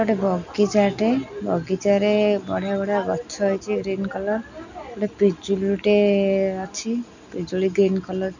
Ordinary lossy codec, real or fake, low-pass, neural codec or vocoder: none; real; 7.2 kHz; none